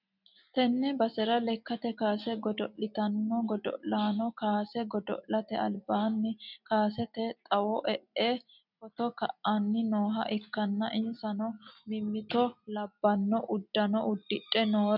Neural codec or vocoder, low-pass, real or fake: none; 5.4 kHz; real